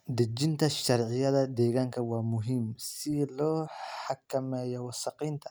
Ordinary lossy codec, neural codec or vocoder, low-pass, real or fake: none; none; none; real